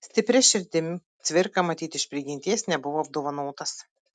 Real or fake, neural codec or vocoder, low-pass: real; none; 9.9 kHz